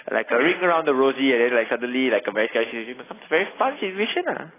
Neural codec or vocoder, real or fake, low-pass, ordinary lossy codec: none; real; 3.6 kHz; AAC, 16 kbps